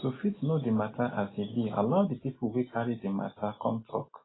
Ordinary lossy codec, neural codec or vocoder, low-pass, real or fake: AAC, 16 kbps; none; 7.2 kHz; real